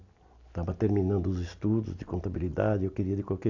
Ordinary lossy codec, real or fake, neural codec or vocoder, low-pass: AAC, 48 kbps; real; none; 7.2 kHz